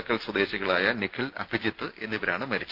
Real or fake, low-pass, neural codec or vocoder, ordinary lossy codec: real; 5.4 kHz; none; Opus, 24 kbps